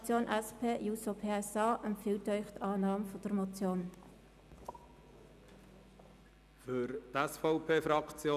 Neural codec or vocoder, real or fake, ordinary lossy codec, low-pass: none; real; none; 14.4 kHz